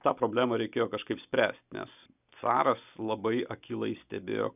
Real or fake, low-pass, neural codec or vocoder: real; 3.6 kHz; none